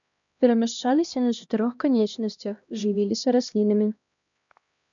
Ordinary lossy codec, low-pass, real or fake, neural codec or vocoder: MP3, 96 kbps; 7.2 kHz; fake; codec, 16 kHz, 1 kbps, X-Codec, HuBERT features, trained on LibriSpeech